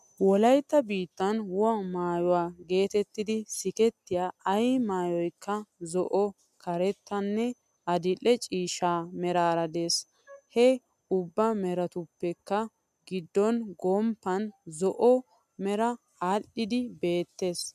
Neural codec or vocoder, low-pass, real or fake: none; 14.4 kHz; real